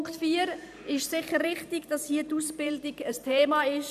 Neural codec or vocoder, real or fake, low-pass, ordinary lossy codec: vocoder, 44.1 kHz, 128 mel bands every 512 samples, BigVGAN v2; fake; 14.4 kHz; none